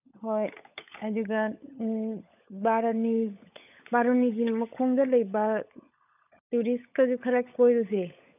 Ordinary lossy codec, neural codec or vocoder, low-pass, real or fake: none; codec, 16 kHz, 16 kbps, FunCodec, trained on LibriTTS, 50 frames a second; 3.6 kHz; fake